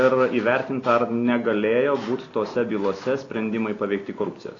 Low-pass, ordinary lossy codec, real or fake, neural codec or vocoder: 7.2 kHz; AAC, 32 kbps; real; none